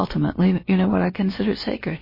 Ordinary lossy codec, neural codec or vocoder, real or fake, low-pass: MP3, 24 kbps; codec, 16 kHz, about 1 kbps, DyCAST, with the encoder's durations; fake; 5.4 kHz